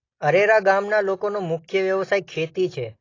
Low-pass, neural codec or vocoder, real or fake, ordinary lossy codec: 7.2 kHz; none; real; AAC, 32 kbps